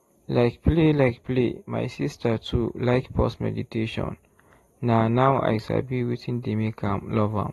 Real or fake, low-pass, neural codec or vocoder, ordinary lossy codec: real; 19.8 kHz; none; AAC, 32 kbps